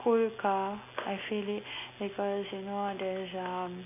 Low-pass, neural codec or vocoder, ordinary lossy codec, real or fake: 3.6 kHz; none; none; real